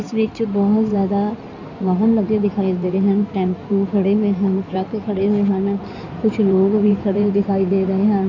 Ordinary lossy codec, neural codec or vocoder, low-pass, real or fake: none; codec, 16 kHz in and 24 kHz out, 2.2 kbps, FireRedTTS-2 codec; 7.2 kHz; fake